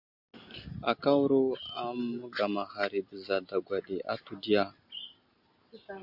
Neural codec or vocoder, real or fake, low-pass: none; real; 5.4 kHz